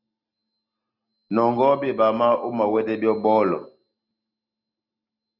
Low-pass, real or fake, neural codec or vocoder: 5.4 kHz; real; none